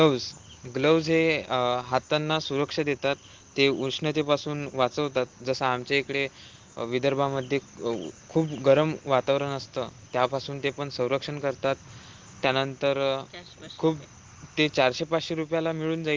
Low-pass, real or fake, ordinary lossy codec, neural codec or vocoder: 7.2 kHz; real; Opus, 16 kbps; none